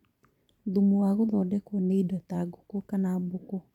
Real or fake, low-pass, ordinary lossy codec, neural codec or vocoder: fake; 19.8 kHz; none; vocoder, 44.1 kHz, 128 mel bands every 512 samples, BigVGAN v2